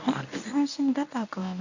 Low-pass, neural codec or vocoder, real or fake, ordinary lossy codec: 7.2 kHz; codec, 24 kHz, 0.9 kbps, WavTokenizer, medium speech release version 1; fake; none